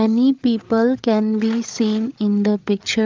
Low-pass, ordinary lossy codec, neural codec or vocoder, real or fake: 7.2 kHz; Opus, 32 kbps; codec, 16 kHz, 8 kbps, FreqCodec, larger model; fake